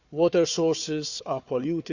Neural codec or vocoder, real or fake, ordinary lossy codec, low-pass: codec, 16 kHz, 4 kbps, FunCodec, trained on Chinese and English, 50 frames a second; fake; none; 7.2 kHz